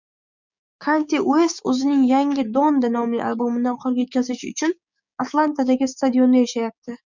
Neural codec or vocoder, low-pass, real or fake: codec, 16 kHz, 6 kbps, DAC; 7.2 kHz; fake